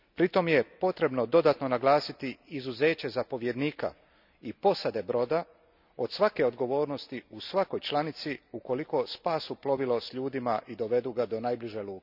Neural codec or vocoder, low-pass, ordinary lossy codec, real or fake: none; 5.4 kHz; none; real